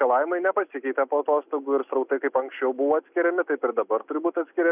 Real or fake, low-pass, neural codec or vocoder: real; 3.6 kHz; none